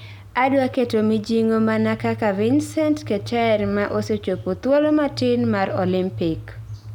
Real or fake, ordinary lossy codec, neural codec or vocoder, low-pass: real; none; none; 19.8 kHz